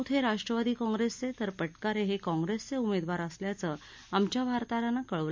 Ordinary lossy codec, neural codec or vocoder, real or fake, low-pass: MP3, 64 kbps; none; real; 7.2 kHz